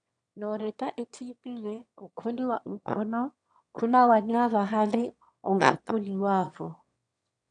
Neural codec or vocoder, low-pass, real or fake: autoencoder, 22.05 kHz, a latent of 192 numbers a frame, VITS, trained on one speaker; 9.9 kHz; fake